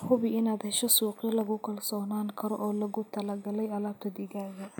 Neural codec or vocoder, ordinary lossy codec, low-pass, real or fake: none; none; none; real